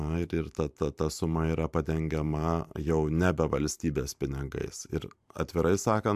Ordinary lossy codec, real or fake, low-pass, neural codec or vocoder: AAC, 96 kbps; fake; 14.4 kHz; vocoder, 44.1 kHz, 128 mel bands every 512 samples, BigVGAN v2